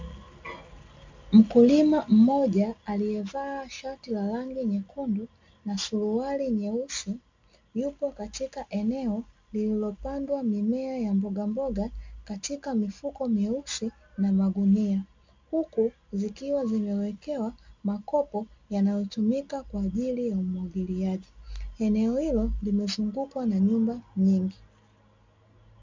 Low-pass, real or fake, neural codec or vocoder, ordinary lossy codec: 7.2 kHz; real; none; MP3, 64 kbps